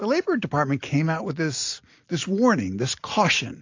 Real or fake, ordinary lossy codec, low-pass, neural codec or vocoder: real; AAC, 48 kbps; 7.2 kHz; none